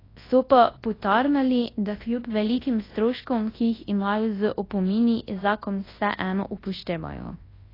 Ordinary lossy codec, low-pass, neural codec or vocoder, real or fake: AAC, 24 kbps; 5.4 kHz; codec, 24 kHz, 0.9 kbps, WavTokenizer, large speech release; fake